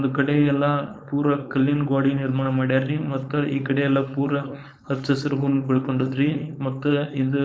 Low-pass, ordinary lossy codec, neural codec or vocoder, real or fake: none; none; codec, 16 kHz, 4.8 kbps, FACodec; fake